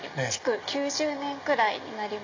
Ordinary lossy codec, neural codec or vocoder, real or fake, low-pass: AAC, 48 kbps; none; real; 7.2 kHz